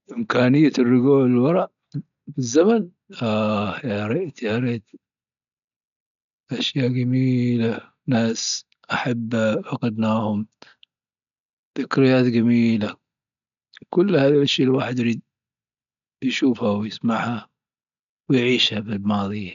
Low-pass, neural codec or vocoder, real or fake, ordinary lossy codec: 7.2 kHz; none; real; none